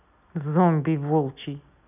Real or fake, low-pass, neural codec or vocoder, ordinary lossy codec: real; 3.6 kHz; none; none